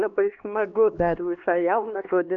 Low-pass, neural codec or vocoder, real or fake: 7.2 kHz; codec, 16 kHz, 2 kbps, X-Codec, HuBERT features, trained on LibriSpeech; fake